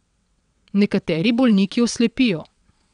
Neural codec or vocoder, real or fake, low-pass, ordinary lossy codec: vocoder, 22.05 kHz, 80 mel bands, WaveNeXt; fake; 9.9 kHz; none